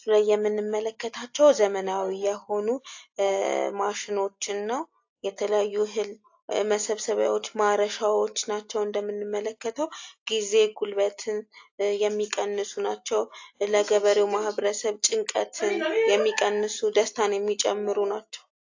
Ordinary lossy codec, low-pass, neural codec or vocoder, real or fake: AAC, 48 kbps; 7.2 kHz; none; real